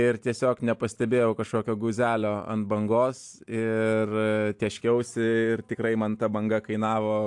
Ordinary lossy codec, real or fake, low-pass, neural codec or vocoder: AAC, 64 kbps; real; 10.8 kHz; none